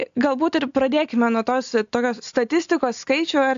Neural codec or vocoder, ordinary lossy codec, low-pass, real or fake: none; AAC, 64 kbps; 7.2 kHz; real